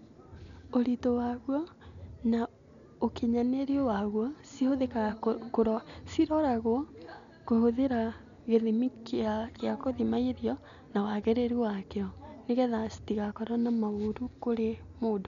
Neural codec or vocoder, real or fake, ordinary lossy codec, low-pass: none; real; none; 7.2 kHz